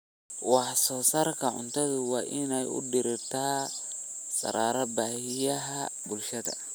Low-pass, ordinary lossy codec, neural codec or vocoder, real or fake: none; none; none; real